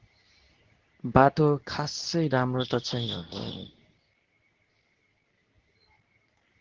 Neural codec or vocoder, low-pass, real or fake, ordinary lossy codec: codec, 24 kHz, 0.9 kbps, WavTokenizer, medium speech release version 2; 7.2 kHz; fake; Opus, 16 kbps